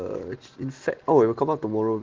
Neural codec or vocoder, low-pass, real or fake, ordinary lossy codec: none; 7.2 kHz; real; Opus, 16 kbps